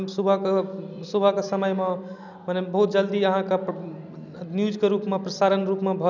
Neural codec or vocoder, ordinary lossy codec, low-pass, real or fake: none; none; 7.2 kHz; real